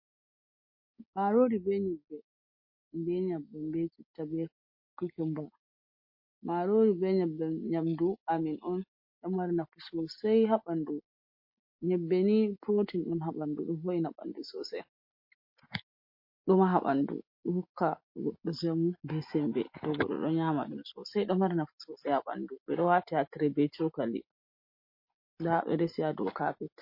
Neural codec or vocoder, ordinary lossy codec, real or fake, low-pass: none; MP3, 32 kbps; real; 5.4 kHz